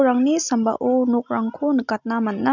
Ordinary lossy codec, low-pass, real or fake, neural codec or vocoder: none; 7.2 kHz; real; none